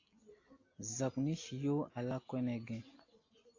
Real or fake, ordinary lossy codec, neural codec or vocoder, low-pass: real; AAC, 32 kbps; none; 7.2 kHz